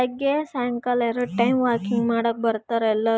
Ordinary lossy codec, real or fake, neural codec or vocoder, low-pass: none; real; none; none